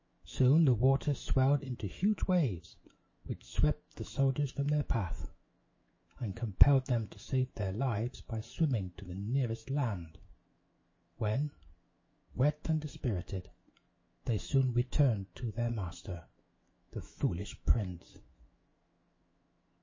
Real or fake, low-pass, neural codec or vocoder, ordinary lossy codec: fake; 7.2 kHz; autoencoder, 48 kHz, 128 numbers a frame, DAC-VAE, trained on Japanese speech; MP3, 32 kbps